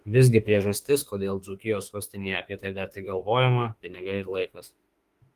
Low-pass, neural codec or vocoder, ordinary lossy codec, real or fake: 14.4 kHz; autoencoder, 48 kHz, 32 numbers a frame, DAC-VAE, trained on Japanese speech; Opus, 24 kbps; fake